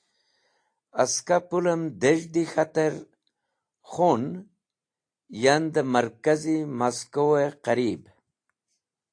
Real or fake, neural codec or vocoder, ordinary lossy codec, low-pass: real; none; AAC, 48 kbps; 9.9 kHz